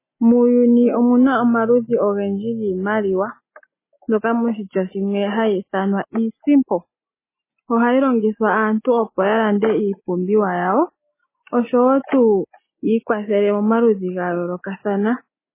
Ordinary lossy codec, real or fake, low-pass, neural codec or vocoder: MP3, 16 kbps; real; 3.6 kHz; none